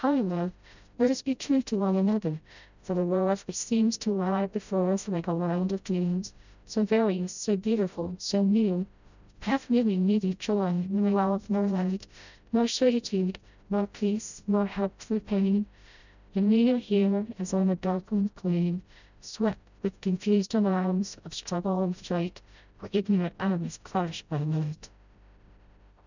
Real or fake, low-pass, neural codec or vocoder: fake; 7.2 kHz; codec, 16 kHz, 0.5 kbps, FreqCodec, smaller model